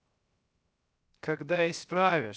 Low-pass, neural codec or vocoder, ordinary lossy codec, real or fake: none; codec, 16 kHz, 0.7 kbps, FocalCodec; none; fake